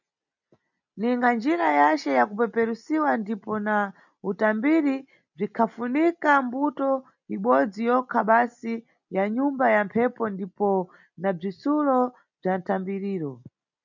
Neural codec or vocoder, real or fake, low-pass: none; real; 7.2 kHz